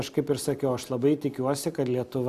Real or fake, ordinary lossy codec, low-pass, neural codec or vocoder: real; AAC, 96 kbps; 14.4 kHz; none